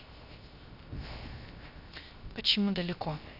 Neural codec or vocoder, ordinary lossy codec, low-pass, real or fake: codec, 16 kHz, 0.3 kbps, FocalCodec; none; 5.4 kHz; fake